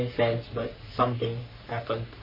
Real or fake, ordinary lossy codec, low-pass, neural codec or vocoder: fake; MP3, 24 kbps; 5.4 kHz; codec, 44.1 kHz, 3.4 kbps, Pupu-Codec